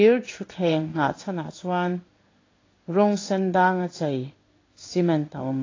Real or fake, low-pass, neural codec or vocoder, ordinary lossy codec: fake; 7.2 kHz; codec, 16 kHz in and 24 kHz out, 1 kbps, XY-Tokenizer; AAC, 32 kbps